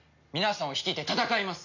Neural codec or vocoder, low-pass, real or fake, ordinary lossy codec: none; 7.2 kHz; real; none